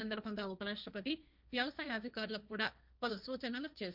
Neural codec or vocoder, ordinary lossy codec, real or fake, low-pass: codec, 16 kHz, 1.1 kbps, Voila-Tokenizer; none; fake; 5.4 kHz